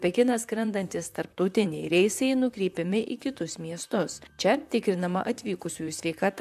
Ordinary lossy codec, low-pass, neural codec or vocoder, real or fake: AAC, 96 kbps; 14.4 kHz; vocoder, 44.1 kHz, 128 mel bands, Pupu-Vocoder; fake